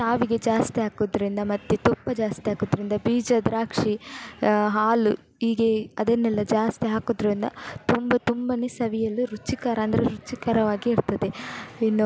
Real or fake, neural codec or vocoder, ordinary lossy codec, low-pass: real; none; none; none